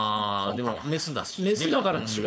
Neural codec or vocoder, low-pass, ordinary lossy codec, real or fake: codec, 16 kHz, 4.8 kbps, FACodec; none; none; fake